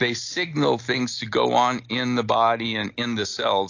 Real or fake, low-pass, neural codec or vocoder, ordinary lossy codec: real; 7.2 kHz; none; AAC, 48 kbps